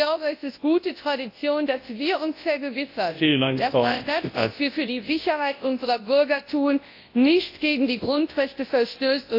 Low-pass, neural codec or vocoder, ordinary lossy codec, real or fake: 5.4 kHz; codec, 24 kHz, 0.9 kbps, WavTokenizer, large speech release; AAC, 32 kbps; fake